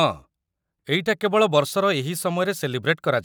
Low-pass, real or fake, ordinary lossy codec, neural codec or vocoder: none; real; none; none